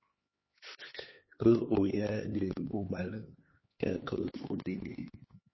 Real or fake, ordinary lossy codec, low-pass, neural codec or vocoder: fake; MP3, 24 kbps; 7.2 kHz; codec, 16 kHz, 2 kbps, X-Codec, HuBERT features, trained on LibriSpeech